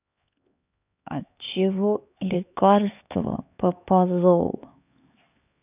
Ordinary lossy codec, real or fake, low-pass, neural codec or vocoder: none; fake; 3.6 kHz; codec, 16 kHz, 4 kbps, X-Codec, HuBERT features, trained on LibriSpeech